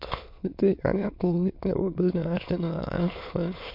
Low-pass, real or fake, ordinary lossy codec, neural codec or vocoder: 5.4 kHz; fake; MP3, 48 kbps; autoencoder, 22.05 kHz, a latent of 192 numbers a frame, VITS, trained on many speakers